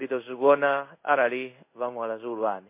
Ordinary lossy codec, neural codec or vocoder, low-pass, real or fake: MP3, 24 kbps; codec, 16 kHz in and 24 kHz out, 1 kbps, XY-Tokenizer; 3.6 kHz; fake